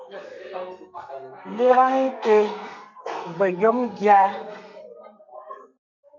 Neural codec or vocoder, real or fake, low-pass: codec, 32 kHz, 1.9 kbps, SNAC; fake; 7.2 kHz